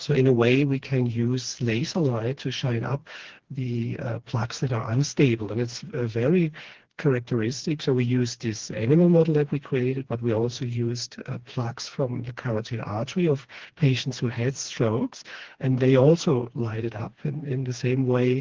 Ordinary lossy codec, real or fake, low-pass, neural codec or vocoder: Opus, 16 kbps; fake; 7.2 kHz; codec, 16 kHz, 2 kbps, FreqCodec, smaller model